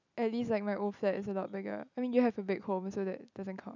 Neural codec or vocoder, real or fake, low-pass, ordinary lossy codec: none; real; 7.2 kHz; none